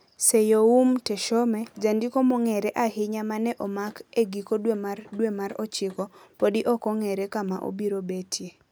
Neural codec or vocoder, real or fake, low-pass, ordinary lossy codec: none; real; none; none